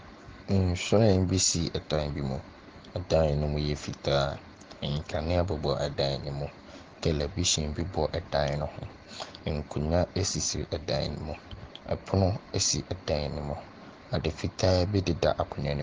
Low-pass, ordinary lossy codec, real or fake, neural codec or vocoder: 7.2 kHz; Opus, 16 kbps; real; none